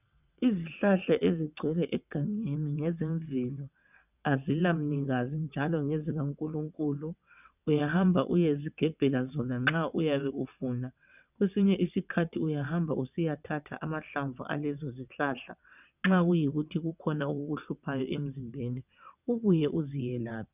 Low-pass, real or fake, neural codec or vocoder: 3.6 kHz; fake; vocoder, 22.05 kHz, 80 mel bands, WaveNeXt